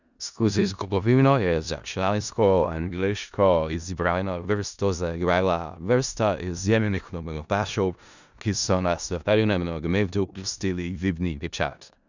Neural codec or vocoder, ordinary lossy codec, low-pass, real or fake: codec, 16 kHz in and 24 kHz out, 0.4 kbps, LongCat-Audio-Codec, four codebook decoder; none; 7.2 kHz; fake